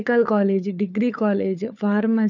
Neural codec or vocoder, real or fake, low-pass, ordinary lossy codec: codec, 16 kHz, 4 kbps, FunCodec, trained on LibriTTS, 50 frames a second; fake; 7.2 kHz; none